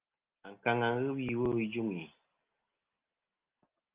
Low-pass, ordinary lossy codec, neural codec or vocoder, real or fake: 3.6 kHz; Opus, 32 kbps; none; real